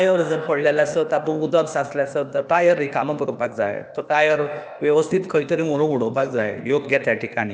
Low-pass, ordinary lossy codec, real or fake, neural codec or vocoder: none; none; fake; codec, 16 kHz, 0.8 kbps, ZipCodec